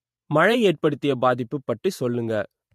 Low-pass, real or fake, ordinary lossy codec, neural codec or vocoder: 14.4 kHz; fake; MP3, 64 kbps; vocoder, 48 kHz, 128 mel bands, Vocos